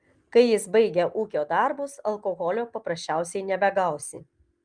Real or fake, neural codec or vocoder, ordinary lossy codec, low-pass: real; none; Opus, 32 kbps; 9.9 kHz